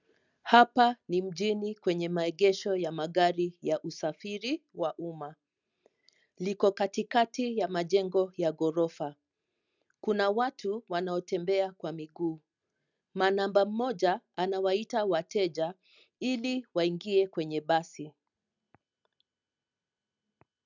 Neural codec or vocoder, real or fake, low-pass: none; real; 7.2 kHz